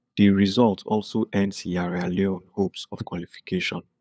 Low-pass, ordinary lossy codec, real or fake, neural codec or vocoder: none; none; fake; codec, 16 kHz, 8 kbps, FunCodec, trained on LibriTTS, 25 frames a second